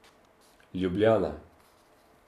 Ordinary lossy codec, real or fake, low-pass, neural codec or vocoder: none; real; 14.4 kHz; none